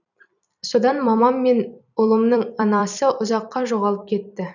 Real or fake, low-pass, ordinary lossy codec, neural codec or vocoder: fake; 7.2 kHz; none; vocoder, 44.1 kHz, 128 mel bands every 256 samples, BigVGAN v2